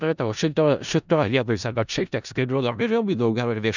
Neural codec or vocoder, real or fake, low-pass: codec, 16 kHz in and 24 kHz out, 0.4 kbps, LongCat-Audio-Codec, four codebook decoder; fake; 7.2 kHz